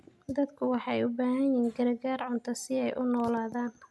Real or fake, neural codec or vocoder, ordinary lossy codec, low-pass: real; none; none; none